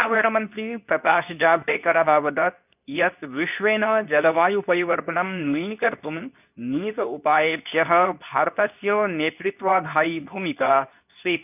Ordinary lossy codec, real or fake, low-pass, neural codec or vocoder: none; fake; 3.6 kHz; codec, 24 kHz, 0.9 kbps, WavTokenizer, medium speech release version 2